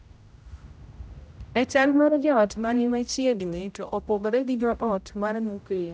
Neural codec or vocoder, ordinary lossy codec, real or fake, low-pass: codec, 16 kHz, 0.5 kbps, X-Codec, HuBERT features, trained on general audio; none; fake; none